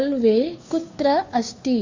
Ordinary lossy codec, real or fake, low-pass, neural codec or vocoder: Opus, 64 kbps; fake; 7.2 kHz; codec, 16 kHz, 2 kbps, FunCodec, trained on Chinese and English, 25 frames a second